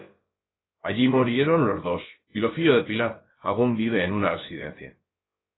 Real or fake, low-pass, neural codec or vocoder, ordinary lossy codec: fake; 7.2 kHz; codec, 16 kHz, about 1 kbps, DyCAST, with the encoder's durations; AAC, 16 kbps